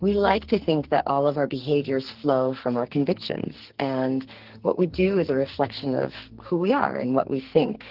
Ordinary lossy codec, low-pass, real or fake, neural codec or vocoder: Opus, 16 kbps; 5.4 kHz; fake; codec, 44.1 kHz, 2.6 kbps, SNAC